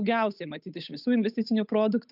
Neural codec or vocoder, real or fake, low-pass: codec, 16 kHz, 8 kbps, FunCodec, trained on Chinese and English, 25 frames a second; fake; 5.4 kHz